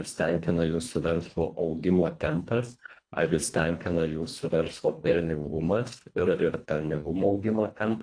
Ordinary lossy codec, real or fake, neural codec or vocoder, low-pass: AAC, 48 kbps; fake; codec, 24 kHz, 1.5 kbps, HILCodec; 9.9 kHz